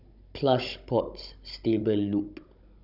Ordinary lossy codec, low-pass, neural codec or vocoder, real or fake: none; 5.4 kHz; codec, 16 kHz, 16 kbps, FunCodec, trained on Chinese and English, 50 frames a second; fake